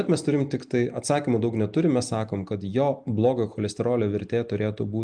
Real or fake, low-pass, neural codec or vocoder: real; 9.9 kHz; none